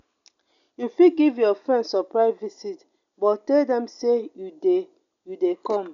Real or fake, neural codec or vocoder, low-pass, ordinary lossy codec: real; none; 7.2 kHz; none